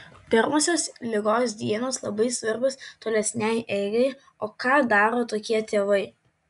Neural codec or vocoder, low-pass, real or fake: none; 10.8 kHz; real